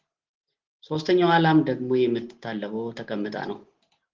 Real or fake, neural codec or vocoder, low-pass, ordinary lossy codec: real; none; 7.2 kHz; Opus, 16 kbps